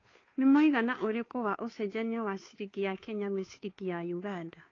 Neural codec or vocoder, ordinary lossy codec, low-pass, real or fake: codec, 16 kHz, 2 kbps, FunCodec, trained on Chinese and English, 25 frames a second; AAC, 32 kbps; 7.2 kHz; fake